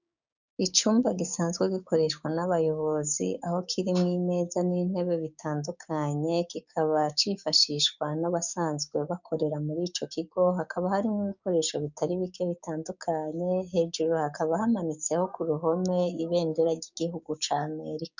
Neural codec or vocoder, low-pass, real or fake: codec, 16 kHz, 6 kbps, DAC; 7.2 kHz; fake